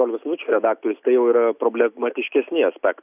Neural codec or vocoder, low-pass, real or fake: none; 3.6 kHz; real